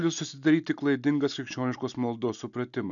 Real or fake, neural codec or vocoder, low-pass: real; none; 7.2 kHz